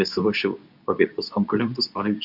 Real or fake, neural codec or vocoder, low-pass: fake; codec, 24 kHz, 0.9 kbps, WavTokenizer, medium speech release version 2; 5.4 kHz